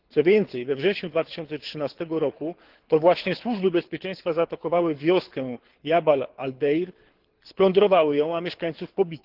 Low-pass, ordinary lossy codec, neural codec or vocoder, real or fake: 5.4 kHz; Opus, 16 kbps; codec, 24 kHz, 6 kbps, HILCodec; fake